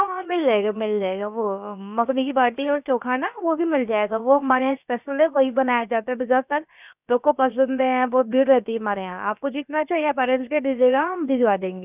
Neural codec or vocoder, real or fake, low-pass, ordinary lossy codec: codec, 16 kHz, about 1 kbps, DyCAST, with the encoder's durations; fake; 3.6 kHz; none